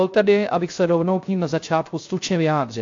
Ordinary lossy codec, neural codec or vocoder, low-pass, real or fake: AAC, 48 kbps; codec, 16 kHz, 0.3 kbps, FocalCodec; 7.2 kHz; fake